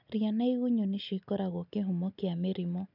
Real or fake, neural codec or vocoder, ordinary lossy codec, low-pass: real; none; none; 5.4 kHz